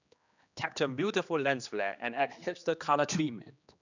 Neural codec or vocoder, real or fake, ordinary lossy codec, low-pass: codec, 16 kHz, 2 kbps, X-Codec, HuBERT features, trained on general audio; fake; none; 7.2 kHz